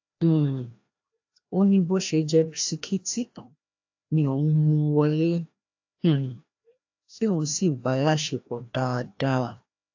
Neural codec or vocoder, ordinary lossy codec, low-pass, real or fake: codec, 16 kHz, 1 kbps, FreqCodec, larger model; AAC, 48 kbps; 7.2 kHz; fake